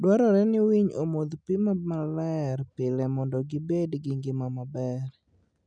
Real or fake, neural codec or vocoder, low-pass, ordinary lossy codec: real; none; 9.9 kHz; none